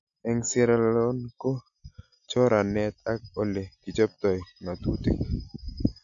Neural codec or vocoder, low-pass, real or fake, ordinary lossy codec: none; 7.2 kHz; real; none